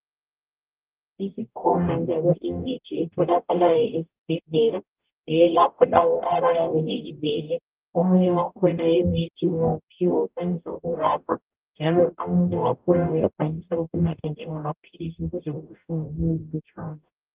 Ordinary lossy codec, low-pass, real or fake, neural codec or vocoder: Opus, 32 kbps; 3.6 kHz; fake; codec, 44.1 kHz, 0.9 kbps, DAC